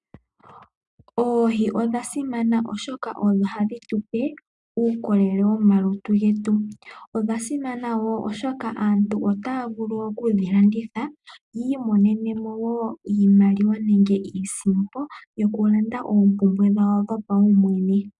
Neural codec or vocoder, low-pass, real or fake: none; 10.8 kHz; real